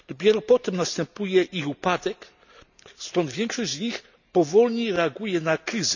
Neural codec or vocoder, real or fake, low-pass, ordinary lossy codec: none; real; 7.2 kHz; none